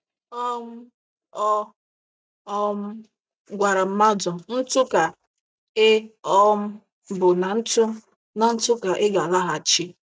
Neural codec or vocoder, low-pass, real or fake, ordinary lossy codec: none; none; real; none